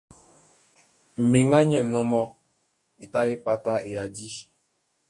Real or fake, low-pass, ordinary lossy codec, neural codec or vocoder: fake; 10.8 kHz; MP3, 64 kbps; codec, 44.1 kHz, 2.6 kbps, DAC